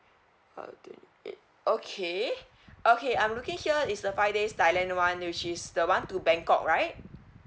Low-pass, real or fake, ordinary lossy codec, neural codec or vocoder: none; real; none; none